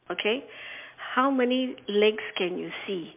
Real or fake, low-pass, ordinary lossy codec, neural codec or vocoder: real; 3.6 kHz; MP3, 32 kbps; none